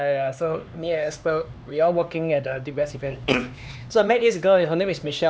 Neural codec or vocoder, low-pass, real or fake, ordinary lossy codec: codec, 16 kHz, 2 kbps, X-Codec, HuBERT features, trained on LibriSpeech; none; fake; none